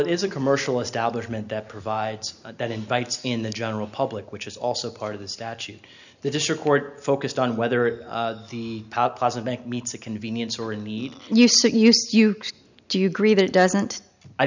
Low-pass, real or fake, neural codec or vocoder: 7.2 kHz; real; none